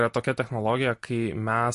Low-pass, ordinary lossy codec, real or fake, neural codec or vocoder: 14.4 kHz; MP3, 48 kbps; real; none